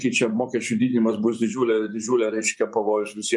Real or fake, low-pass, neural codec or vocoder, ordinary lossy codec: fake; 10.8 kHz; vocoder, 24 kHz, 100 mel bands, Vocos; MP3, 64 kbps